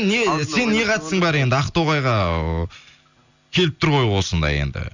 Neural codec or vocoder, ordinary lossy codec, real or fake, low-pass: none; none; real; 7.2 kHz